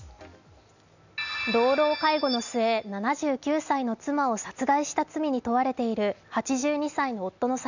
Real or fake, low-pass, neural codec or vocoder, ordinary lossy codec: real; 7.2 kHz; none; none